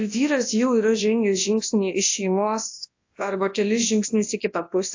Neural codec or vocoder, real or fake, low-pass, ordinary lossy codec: codec, 24 kHz, 0.9 kbps, WavTokenizer, large speech release; fake; 7.2 kHz; AAC, 48 kbps